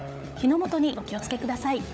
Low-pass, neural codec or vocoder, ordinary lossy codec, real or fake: none; codec, 16 kHz, 16 kbps, FunCodec, trained on LibriTTS, 50 frames a second; none; fake